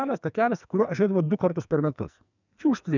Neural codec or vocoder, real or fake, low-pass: codec, 44.1 kHz, 2.6 kbps, SNAC; fake; 7.2 kHz